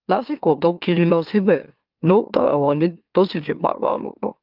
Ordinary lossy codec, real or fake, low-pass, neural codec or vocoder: Opus, 24 kbps; fake; 5.4 kHz; autoencoder, 44.1 kHz, a latent of 192 numbers a frame, MeloTTS